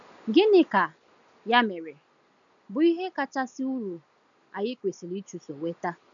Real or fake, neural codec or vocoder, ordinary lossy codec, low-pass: real; none; none; 7.2 kHz